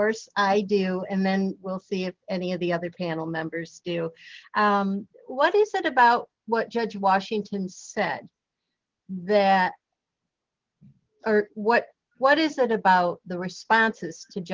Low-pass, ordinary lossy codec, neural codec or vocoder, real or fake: 7.2 kHz; Opus, 16 kbps; none; real